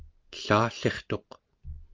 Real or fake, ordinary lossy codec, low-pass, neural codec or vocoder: real; Opus, 32 kbps; 7.2 kHz; none